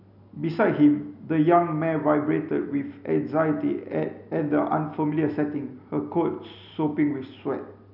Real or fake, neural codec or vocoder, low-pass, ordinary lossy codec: real; none; 5.4 kHz; none